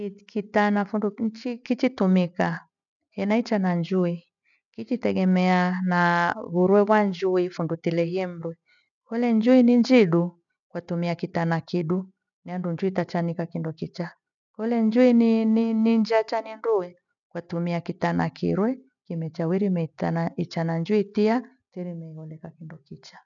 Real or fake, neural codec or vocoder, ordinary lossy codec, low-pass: real; none; none; 7.2 kHz